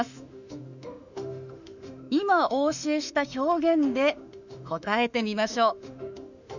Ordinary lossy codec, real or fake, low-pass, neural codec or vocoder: none; fake; 7.2 kHz; autoencoder, 48 kHz, 32 numbers a frame, DAC-VAE, trained on Japanese speech